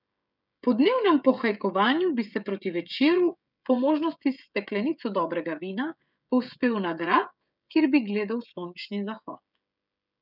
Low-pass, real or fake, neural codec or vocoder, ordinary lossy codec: 5.4 kHz; fake; codec, 16 kHz, 16 kbps, FreqCodec, smaller model; none